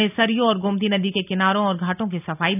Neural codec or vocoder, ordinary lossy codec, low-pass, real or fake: none; AAC, 32 kbps; 3.6 kHz; real